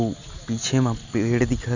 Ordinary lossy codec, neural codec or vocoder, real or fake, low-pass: none; none; real; 7.2 kHz